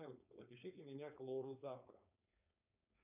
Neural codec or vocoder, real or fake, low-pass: codec, 16 kHz, 4.8 kbps, FACodec; fake; 3.6 kHz